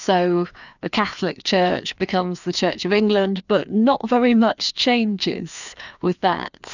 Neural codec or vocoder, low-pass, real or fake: codec, 16 kHz, 2 kbps, FreqCodec, larger model; 7.2 kHz; fake